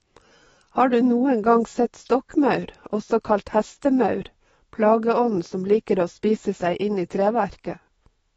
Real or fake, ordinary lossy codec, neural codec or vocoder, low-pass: fake; AAC, 24 kbps; autoencoder, 48 kHz, 128 numbers a frame, DAC-VAE, trained on Japanese speech; 19.8 kHz